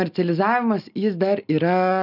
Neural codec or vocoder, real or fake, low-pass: none; real; 5.4 kHz